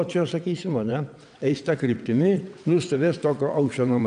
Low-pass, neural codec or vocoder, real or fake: 9.9 kHz; vocoder, 22.05 kHz, 80 mel bands, WaveNeXt; fake